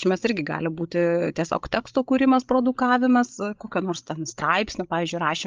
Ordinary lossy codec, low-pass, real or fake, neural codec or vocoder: Opus, 32 kbps; 7.2 kHz; fake; codec, 16 kHz, 16 kbps, FreqCodec, larger model